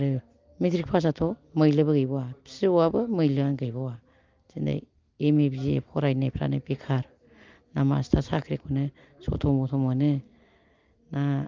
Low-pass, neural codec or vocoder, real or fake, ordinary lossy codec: 7.2 kHz; none; real; Opus, 32 kbps